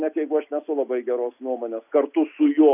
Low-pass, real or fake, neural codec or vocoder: 3.6 kHz; real; none